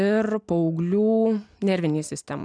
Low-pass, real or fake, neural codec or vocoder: 9.9 kHz; real; none